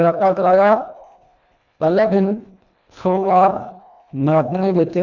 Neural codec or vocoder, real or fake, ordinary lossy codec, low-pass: codec, 24 kHz, 1.5 kbps, HILCodec; fake; none; 7.2 kHz